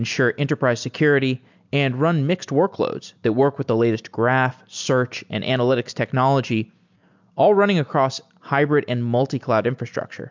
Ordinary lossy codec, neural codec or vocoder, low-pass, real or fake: MP3, 64 kbps; none; 7.2 kHz; real